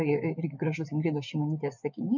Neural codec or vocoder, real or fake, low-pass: vocoder, 24 kHz, 100 mel bands, Vocos; fake; 7.2 kHz